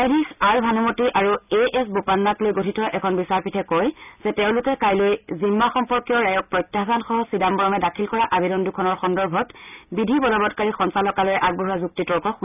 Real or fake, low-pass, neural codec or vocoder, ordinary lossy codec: real; 3.6 kHz; none; none